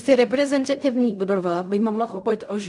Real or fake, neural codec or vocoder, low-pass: fake; codec, 16 kHz in and 24 kHz out, 0.4 kbps, LongCat-Audio-Codec, fine tuned four codebook decoder; 10.8 kHz